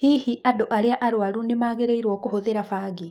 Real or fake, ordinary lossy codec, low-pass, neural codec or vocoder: fake; none; 19.8 kHz; codec, 44.1 kHz, 7.8 kbps, DAC